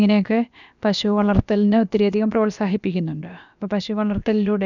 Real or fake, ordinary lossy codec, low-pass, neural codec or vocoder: fake; none; 7.2 kHz; codec, 16 kHz, about 1 kbps, DyCAST, with the encoder's durations